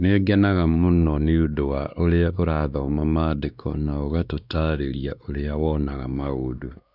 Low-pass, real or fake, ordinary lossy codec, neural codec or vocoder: 5.4 kHz; fake; none; codec, 16 kHz, 2 kbps, X-Codec, WavLM features, trained on Multilingual LibriSpeech